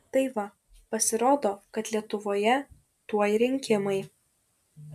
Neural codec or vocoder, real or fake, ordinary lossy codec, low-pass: none; real; MP3, 96 kbps; 14.4 kHz